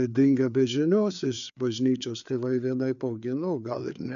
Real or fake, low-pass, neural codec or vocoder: fake; 7.2 kHz; codec, 16 kHz, 4 kbps, FreqCodec, larger model